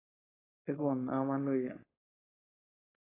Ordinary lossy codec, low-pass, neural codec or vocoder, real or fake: AAC, 16 kbps; 3.6 kHz; codec, 16 kHz, 4.8 kbps, FACodec; fake